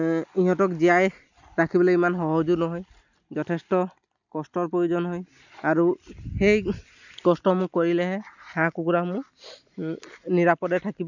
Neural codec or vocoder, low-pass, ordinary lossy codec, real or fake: none; 7.2 kHz; none; real